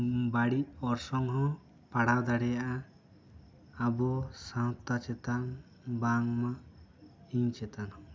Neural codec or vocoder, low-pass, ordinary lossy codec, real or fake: none; none; none; real